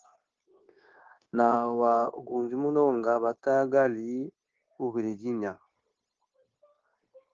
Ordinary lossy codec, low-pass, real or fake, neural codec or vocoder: Opus, 16 kbps; 7.2 kHz; fake; codec, 16 kHz, 0.9 kbps, LongCat-Audio-Codec